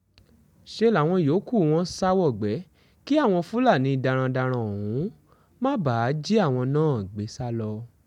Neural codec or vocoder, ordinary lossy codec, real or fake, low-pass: none; none; real; 19.8 kHz